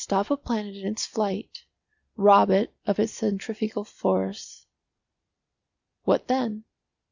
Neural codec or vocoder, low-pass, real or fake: none; 7.2 kHz; real